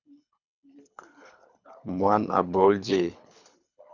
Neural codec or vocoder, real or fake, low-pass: codec, 24 kHz, 3 kbps, HILCodec; fake; 7.2 kHz